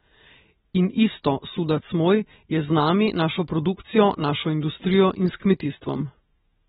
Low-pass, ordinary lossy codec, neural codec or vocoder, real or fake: 10.8 kHz; AAC, 16 kbps; none; real